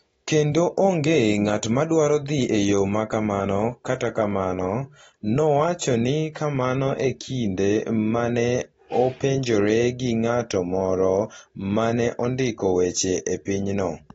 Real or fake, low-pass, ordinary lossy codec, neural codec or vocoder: real; 19.8 kHz; AAC, 24 kbps; none